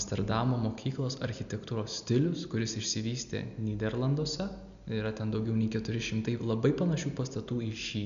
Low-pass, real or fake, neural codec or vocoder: 7.2 kHz; real; none